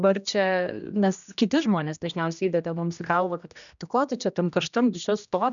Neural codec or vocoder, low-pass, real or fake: codec, 16 kHz, 1 kbps, X-Codec, HuBERT features, trained on general audio; 7.2 kHz; fake